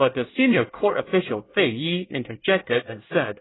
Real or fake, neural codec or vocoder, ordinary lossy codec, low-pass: fake; codec, 24 kHz, 1 kbps, SNAC; AAC, 16 kbps; 7.2 kHz